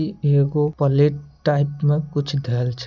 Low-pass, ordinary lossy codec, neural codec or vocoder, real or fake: 7.2 kHz; none; none; real